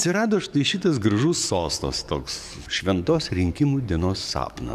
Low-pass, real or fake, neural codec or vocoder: 14.4 kHz; fake; codec, 44.1 kHz, 7.8 kbps, DAC